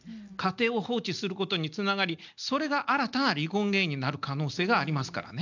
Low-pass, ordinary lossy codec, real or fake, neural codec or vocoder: 7.2 kHz; none; real; none